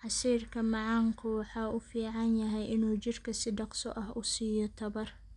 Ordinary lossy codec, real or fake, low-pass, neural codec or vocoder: none; real; 10.8 kHz; none